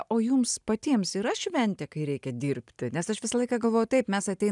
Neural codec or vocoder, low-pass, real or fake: none; 10.8 kHz; real